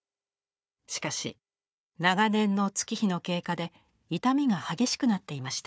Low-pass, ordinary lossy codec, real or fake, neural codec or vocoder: none; none; fake; codec, 16 kHz, 4 kbps, FunCodec, trained on Chinese and English, 50 frames a second